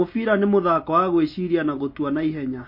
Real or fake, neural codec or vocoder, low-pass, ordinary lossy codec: real; none; 5.4 kHz; MP3, 32 kbps